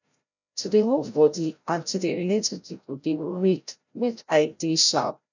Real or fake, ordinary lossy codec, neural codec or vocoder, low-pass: fake; none; codec, 16 kHz, 0.5 kbps, FreqCodec, larger model; 7.2 kHz